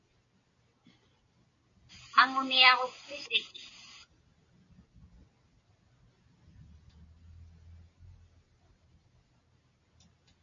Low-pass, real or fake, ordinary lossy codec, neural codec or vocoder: 7.2 kHz; real; MP3, 64 kbps; none